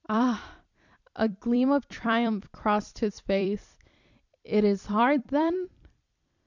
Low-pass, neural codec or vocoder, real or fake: 7.2 kHz; vocoder, 44.1 kHz, 128 mel bands every 256 samples, BigVGAN v2; fake